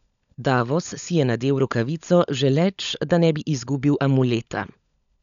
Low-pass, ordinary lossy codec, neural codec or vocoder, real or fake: 7.2 kHz; none; codec, 16 kHz, 16 kbps, FunCodec, trained on LibriTTS, 50 frames a second; fake